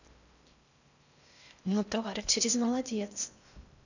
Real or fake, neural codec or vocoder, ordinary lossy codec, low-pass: fake; codec, 16 kHz in and 24 kHz out, 0.6 kbps, FocalCodec, streaming, 4096 codes; none; 7.2 kHz